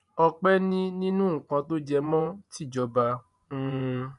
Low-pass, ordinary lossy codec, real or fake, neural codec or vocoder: 10.8 kHz; none; fake; vocoder, 24 kHz, 100 mel bands, Vocos